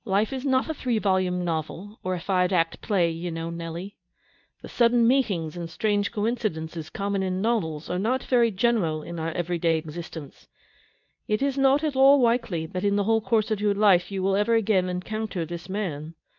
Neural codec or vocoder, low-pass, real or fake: codec, 24 kHz, 0.9 kbps, WavTokenizer, medium speech release version 2; 7.2 kHz; fake